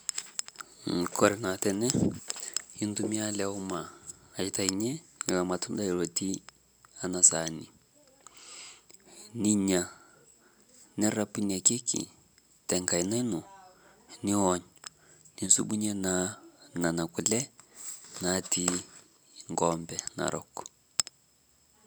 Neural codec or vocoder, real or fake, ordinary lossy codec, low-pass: none; real; none; none